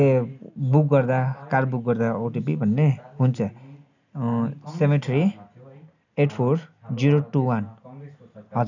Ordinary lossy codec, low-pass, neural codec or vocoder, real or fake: none; 7.2 kHz; none; real